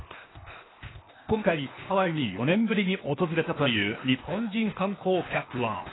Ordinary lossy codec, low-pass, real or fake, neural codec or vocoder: AAC, 16 kbps; 7.2 kHz; fake; codec, 16 kHz, 0.8 kbps, ZipCodec